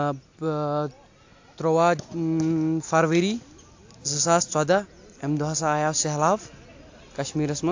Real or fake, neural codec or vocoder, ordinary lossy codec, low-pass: real; none; AAC, 48 kbps; 7.2 kHz